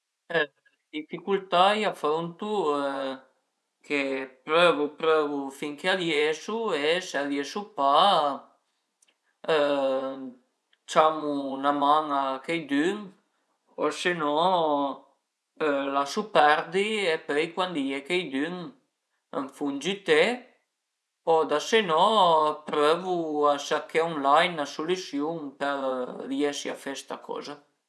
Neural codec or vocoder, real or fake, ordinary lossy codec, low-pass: vocoder, 24 kHz, 100 mel bands, Vocos; fake; none; none